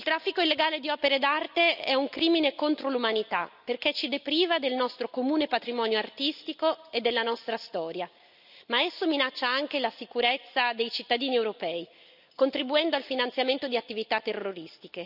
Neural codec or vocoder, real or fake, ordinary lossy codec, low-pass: none; real; none; 5.4 kHz